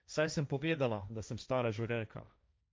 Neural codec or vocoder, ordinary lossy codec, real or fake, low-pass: codec, 16 kHz, 1.1 kbps, Voila-Tokenizer; none; fake; none